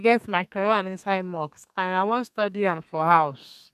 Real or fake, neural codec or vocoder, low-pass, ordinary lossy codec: fake; codec, 32 kHz, 1.9 kbps, SNAC; 14.4 kHz; none